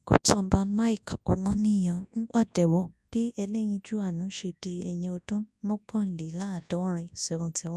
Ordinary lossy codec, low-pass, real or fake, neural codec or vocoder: none; none; fake; codec, 24 kHz, 0.9 kbps, WavTokenizer, large speech release